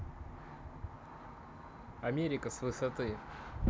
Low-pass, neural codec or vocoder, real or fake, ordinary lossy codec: none; none; real; none